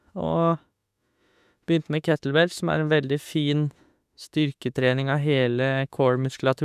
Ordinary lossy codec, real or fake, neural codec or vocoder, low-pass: none; fake; autoencoder, 48 kHz, 32 numbers a frame, DAC-VAE, trained on Japanese speech; 14.4 kHz